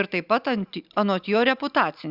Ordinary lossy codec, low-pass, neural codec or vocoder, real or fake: Opus, 64 kbps; 5.4 kHz; none; real